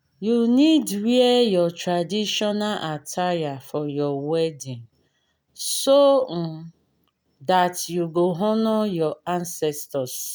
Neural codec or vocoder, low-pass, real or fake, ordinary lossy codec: none; none; real; none